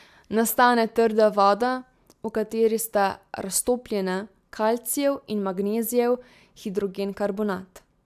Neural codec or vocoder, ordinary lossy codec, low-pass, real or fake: none; AAC, 96 kbps; 14.4 kHz; real